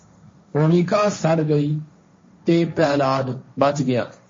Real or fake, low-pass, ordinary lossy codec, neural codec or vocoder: fake; 7.2 kHz; MP3, 32 kbps; codec, 16 kHz, 1.1 kbps, Voila-Tokenizer